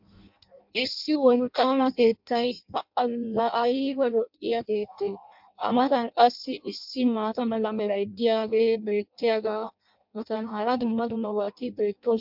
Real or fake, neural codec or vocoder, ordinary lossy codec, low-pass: fake; codec, 16 kHz in and 24 kHz out, 0.6 kbps, FireRedTTS-2 codec; MP3, 48 kbps; 5.4 kHz